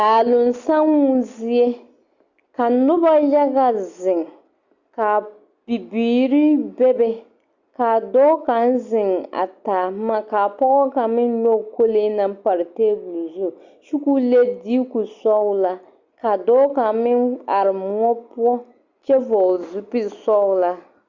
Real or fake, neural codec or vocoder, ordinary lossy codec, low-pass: real; none; Opus, 64 kbps; 7.2 kHz